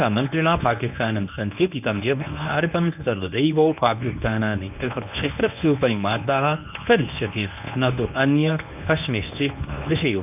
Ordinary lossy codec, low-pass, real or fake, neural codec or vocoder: none; 3.6 kHz; fake; codec, 24 kHz, 0.9 kbps, WavTokenizer, medium speech release version 1